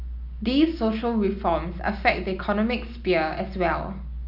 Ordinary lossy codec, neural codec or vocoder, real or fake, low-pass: none; none; real; 5.4 kHz